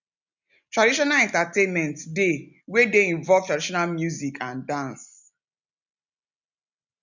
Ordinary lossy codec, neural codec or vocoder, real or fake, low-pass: none; none; real; 7.2 kHz